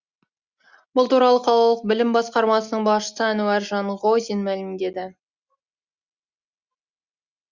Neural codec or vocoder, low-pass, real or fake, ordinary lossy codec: none; 7.2 kHz; real; Opus, 64 kbps